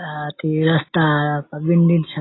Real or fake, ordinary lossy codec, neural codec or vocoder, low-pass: real; AAC, 16 kbps; none; 7.2 kHz